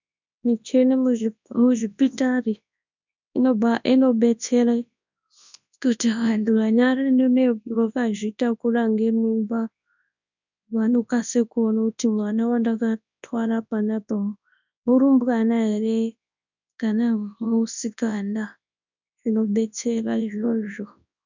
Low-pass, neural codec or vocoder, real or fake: 7.2 kHz; codec, 24 kHz, 0.9 kbps, WavTokenizer, large speech release; fake